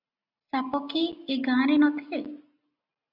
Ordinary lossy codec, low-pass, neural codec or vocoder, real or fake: AAC, 48 kbps; 5.4 kHz; none; real